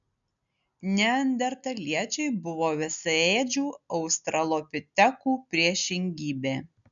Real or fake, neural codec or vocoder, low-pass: real; none; 7.2 kHz